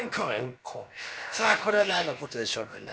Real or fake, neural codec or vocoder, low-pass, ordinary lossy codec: fake; codec, 16 kHz, about 1 kbps, DyCAST, with the encoder's durations; none; none